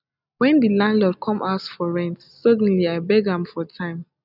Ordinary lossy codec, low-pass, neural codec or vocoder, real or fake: none; 5.4 kHz; none; real